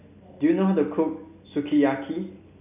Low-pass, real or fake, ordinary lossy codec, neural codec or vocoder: 3.6 kHz; real; none; none